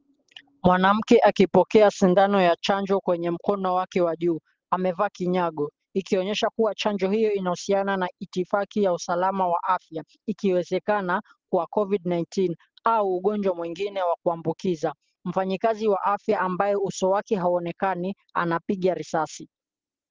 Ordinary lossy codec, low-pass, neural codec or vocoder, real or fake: Opus, 16 kbps; 7.2 kHz; none; real